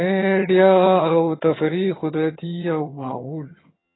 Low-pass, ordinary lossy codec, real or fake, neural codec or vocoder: 7.2 kHz; AAC, 16 kbps; fake; vocoder, 22.05 kHz, 80 mel bands, HiFi-GAN